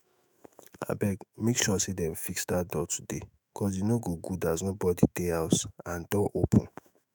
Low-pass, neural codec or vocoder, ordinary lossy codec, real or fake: none; autoencoder, 48 kHz, 128 numbers a frame, DAC-VAE, trained on Japanese speech; none; fake